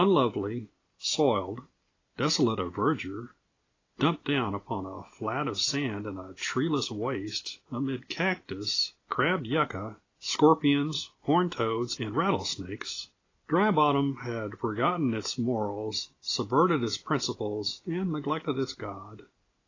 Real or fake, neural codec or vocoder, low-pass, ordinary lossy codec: real; none; 7.2 kHz; AAC, 32 kbps